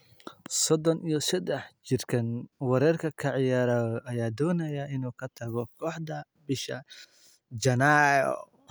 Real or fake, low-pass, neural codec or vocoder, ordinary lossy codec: real; none; none; none